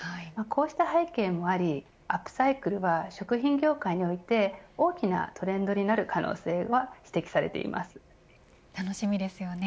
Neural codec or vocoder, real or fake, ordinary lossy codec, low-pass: none; real; none; none